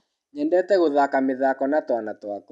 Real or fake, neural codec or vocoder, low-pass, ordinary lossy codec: real; none; none; none